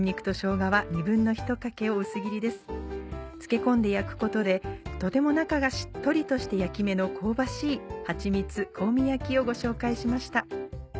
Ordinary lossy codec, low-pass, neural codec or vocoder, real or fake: none; none; none; real